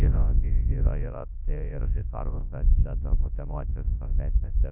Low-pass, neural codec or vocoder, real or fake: 3.6 kHz; codec, 24 kHz, 0.9 kbps, WavTokenizer, large speech release; fake